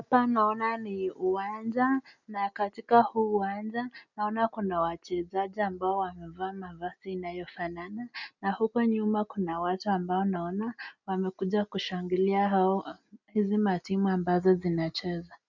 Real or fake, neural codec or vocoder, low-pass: real; none; 7.2 kHz